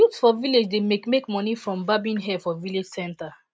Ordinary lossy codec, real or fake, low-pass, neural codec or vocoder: none; real; none; none